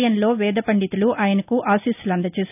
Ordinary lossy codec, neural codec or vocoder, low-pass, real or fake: none; none; 3.6 kHz; real